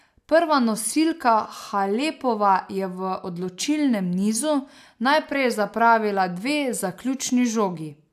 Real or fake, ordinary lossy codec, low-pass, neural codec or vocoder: real; AAC, 96 kbps; 14.4 kHz; none